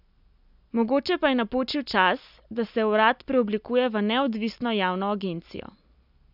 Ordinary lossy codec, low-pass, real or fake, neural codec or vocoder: none; 5.4 kHz; real; none